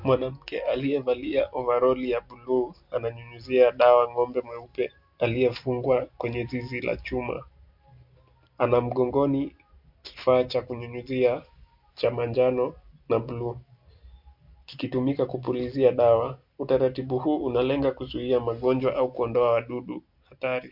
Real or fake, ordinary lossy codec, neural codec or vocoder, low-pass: real; MP3, 48 kbps; none; 5.4 kHz